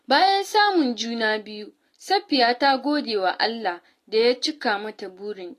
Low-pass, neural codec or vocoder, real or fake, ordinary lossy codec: 14.4 kHz; none; real; AAC, 48 kbps